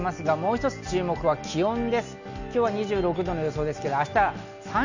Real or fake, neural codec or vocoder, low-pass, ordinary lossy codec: real; none; 7.2 kHz; none